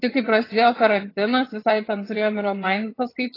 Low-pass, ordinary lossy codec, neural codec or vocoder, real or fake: 5.4 kHz; AAC, 24 kbps; vocoder, 22.05 kHz, 80 mel bands, HiFi-GAN; fake